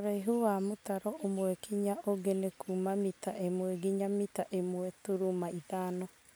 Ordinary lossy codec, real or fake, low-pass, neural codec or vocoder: none; real; none; none